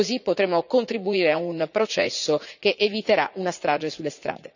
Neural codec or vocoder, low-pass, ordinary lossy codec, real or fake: vocoder, 44.1 kHz, 80 mel bands, Vocos; 7.2 kHz; none; fake